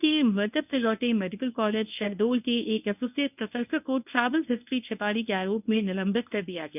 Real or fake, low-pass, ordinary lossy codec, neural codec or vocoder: fake; 3.6 kHz; none; codec, 24 kHz, 0.9 kbps, WavTokenizer, medium speech release version 1